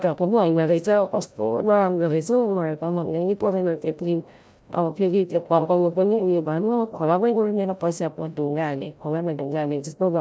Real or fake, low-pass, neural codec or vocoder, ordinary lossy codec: fake; none; codec, 16 kHz, 0.5 kbps, FreqCodec, larger model; none